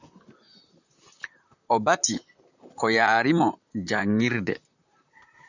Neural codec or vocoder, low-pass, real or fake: vocoder, 44.1 kHz, 128 mel bands, Pupu-Vocoder; 7.2 kHz; fake